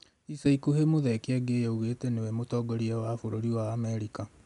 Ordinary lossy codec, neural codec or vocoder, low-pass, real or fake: none; none; 10.8 kHz; real